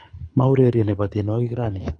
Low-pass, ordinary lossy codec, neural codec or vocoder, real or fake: 9.9 kHz; AAC, 48 kbps; codec, 24 kHz, 6 kbps, HILCodec; fake